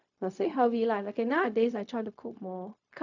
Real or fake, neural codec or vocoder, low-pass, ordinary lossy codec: fake; codec, 16 kHz, 0.4 kbps, LongCat-Audio-Codec; 7.2 kHz; none